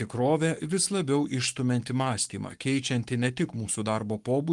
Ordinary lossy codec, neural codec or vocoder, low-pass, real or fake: Opus, 32 kbps; codec, 44.1 kHz, 7.8 kbps, Pupu-Codec; 10.8 kHz; fake